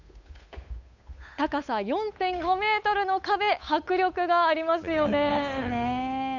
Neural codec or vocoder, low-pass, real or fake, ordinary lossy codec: codec, 16 kHz, 8 kbps, FunCodec, trained on Chinese and English, 25 frames a second; 7.2 kHz; fake; none